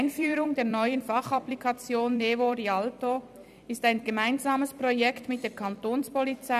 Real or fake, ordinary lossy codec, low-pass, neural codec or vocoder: fake; none; 14.4 kHz; vocoder, 44.1 kHz, 128 mel bands every 256 samples, BigVGAN v2